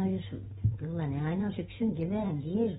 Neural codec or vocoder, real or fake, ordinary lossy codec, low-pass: none; real; AAC, 16 kbps; 19.8 kHz